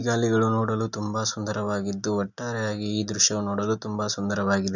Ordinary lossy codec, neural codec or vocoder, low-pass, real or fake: none; none; 7.2 kHz; real